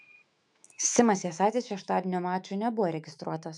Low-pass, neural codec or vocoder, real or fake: 10.8 kHz; autoencoder, 48 kHz, 128 numbers a frame, DAC-VAE, trained on Japanese speech; fake